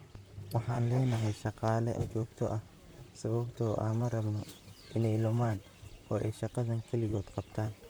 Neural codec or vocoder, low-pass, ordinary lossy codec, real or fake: vocoder, 44.1 kHz, 128 mel bands, Pupu-Vocoder; none; none; fake